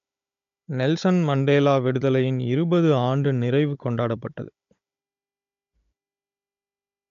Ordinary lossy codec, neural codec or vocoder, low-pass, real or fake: MP3, 64 kbps; codec, 16 kHz, 16 kbps, FunCodec, trained on Chinese and English, 50 frames a second; 7.2 kHz; fake